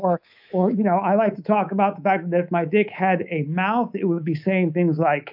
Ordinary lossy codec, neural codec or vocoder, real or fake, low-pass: AAC, 48 kbps; codec, 24 kHz, 3.1 kbps, DualCodec; fake; 5.4 kHz